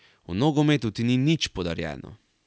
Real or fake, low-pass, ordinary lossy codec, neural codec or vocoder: real; none; none; none